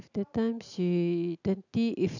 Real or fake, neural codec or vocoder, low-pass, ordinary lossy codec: real; none; 7.2 kHz; none